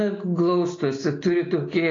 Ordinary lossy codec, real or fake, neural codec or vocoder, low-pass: AAC, 32 kbps; real; none; 7.2 kHz